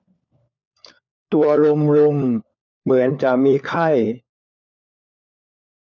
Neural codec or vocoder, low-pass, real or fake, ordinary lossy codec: codec, 16 kHz, 4 kbps, FunCodec, trained on LibriTTS, 50 frames a second; 7.2 kHz; fake; none